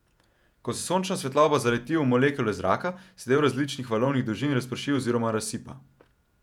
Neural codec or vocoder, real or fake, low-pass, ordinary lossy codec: vocoder, 48 kHz, 128 mel bands, Vocos; fake; 19.8 kHz; none